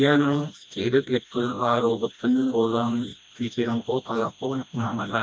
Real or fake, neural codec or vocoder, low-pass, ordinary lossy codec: fake; codec, 16 kHz, 1 kbps, FreqCodec, smaller model; none; none